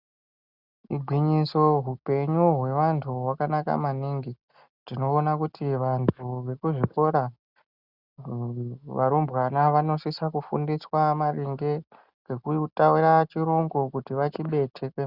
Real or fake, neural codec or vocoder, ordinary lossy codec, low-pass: real; none; Opus, 64 kbps; 5.4 kHz